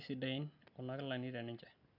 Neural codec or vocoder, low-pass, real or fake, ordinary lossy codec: none; 5.4 kHz; real; none